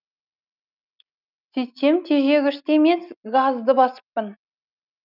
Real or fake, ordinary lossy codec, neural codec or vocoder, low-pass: real; none; none; 5.4 kHz